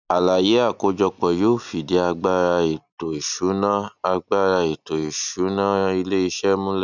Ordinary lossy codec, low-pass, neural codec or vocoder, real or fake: none; 7.2 kHz; none; real